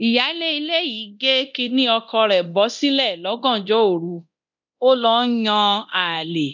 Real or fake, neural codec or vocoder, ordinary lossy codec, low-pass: fake; codec, 24 kHz, 0.9 kbps, DualCodec; none; 7.2 kHz